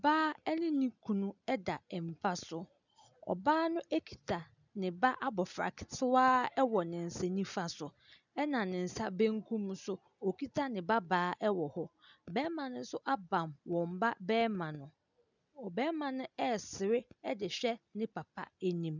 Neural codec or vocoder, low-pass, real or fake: none; 7.2 kHz; real